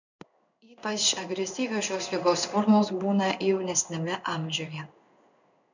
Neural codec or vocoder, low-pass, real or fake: codec, 16 kHz in and 24 kHz out, 1 kbps, XY-Tokenizer; 7.2 kHz; fake